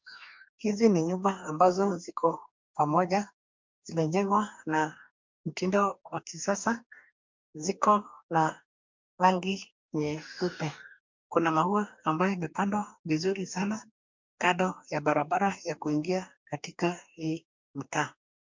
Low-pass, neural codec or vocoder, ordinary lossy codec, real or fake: 7.2 kHz; codec, 44.1 kHz, 2.6 kbps, DAC; MP3, 64 kbps; fake